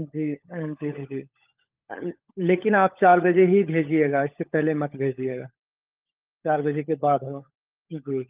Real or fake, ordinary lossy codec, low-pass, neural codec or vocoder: fake; Opus, 32 kbps; 3.6 kHz; codec, 16 kHz, 16 kbps, FunCodec, trained on LibriTTS, 50 frames a second